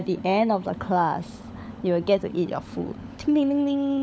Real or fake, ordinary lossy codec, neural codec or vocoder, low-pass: fake; none; codec, 16 kHz, 16 kbps, FunCodec, trained on LibriTTS, 50 frames a second; none